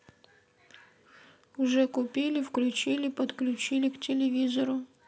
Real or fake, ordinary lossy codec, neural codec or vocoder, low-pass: real; none; none; none